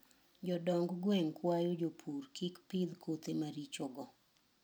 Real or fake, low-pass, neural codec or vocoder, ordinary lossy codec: real; none; none; none